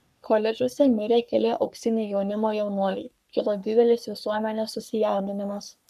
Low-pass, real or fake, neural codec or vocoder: 14.4 kHz; fake; codec, 44.1 kHz, 3.4 kbps, Pupu-Codec